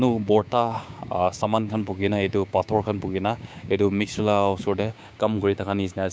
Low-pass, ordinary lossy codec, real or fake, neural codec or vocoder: none; none; fake; codec, 16 kHz, 6 kbps, DAC